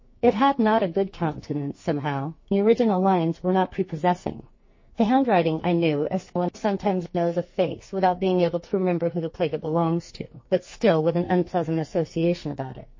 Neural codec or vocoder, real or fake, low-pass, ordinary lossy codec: codec, 44.1 kHz, 2.6 kbps, SNAC; fake; 7.2 kHz; MP3, 32 kbps